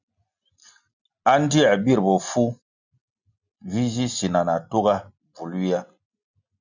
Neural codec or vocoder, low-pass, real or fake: none; 7.2 kHz; real